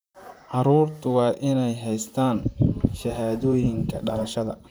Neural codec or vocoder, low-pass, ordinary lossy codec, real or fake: vocoder, 44.1 kHz, 128 mel bands, Pupu-Vocoder; none; none; fake